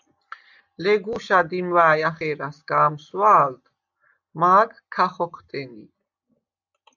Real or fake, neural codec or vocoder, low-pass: real; none; 7.2 kHz